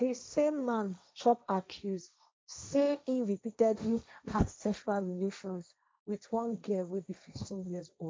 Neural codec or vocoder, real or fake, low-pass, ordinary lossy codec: codec, 16 kHz, 1.1 kbps, Voila-Tokenizer; fake; none; none